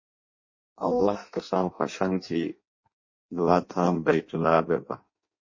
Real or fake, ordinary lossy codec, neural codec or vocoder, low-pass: fake; MP3, 32 kbps; codec, 16 kHz in and 24 kHz out, 0.6 kbps, FireRedTTS-2 codec; 7.2 kHz